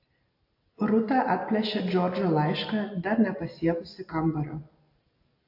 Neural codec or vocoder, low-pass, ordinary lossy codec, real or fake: none; 5.4 kHz; AAC, 32 kbps; real